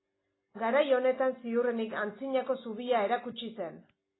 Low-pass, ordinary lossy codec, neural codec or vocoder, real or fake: 7.2 kHz; AAC, 16 kbps; none; real